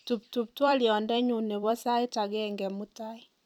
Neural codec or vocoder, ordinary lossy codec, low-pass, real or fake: vocoder, 44.1 kHz, 128 mel bands every 256 samples, BigVGAN v2; none; 19.8 kHz; fake